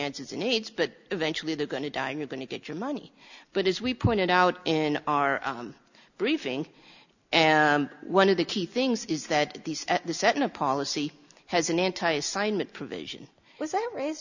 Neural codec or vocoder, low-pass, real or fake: none; 7.2 kHz; real